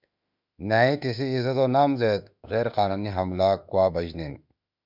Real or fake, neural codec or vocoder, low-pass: fake; autoencoder, 48 kHz, 32 numbers a frame, DAC-VAE, trained on Japanese speech; 5.4 kHz